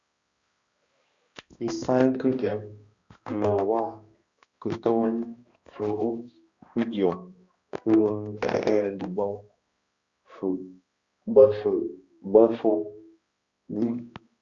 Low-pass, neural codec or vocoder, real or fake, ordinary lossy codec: 7.2 kHz; codec, 16 kHz, 1 kbps, X-Codec, HuBERT features, trained on balanced general audio; fake; Opus, 64 kbps